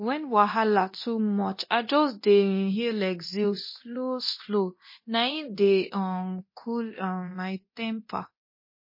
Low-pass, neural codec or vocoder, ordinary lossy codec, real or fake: 5.4 kHz; codec, 24 kHz, 0.9 kbps, DualCodec; MP3, 24 kbps; fake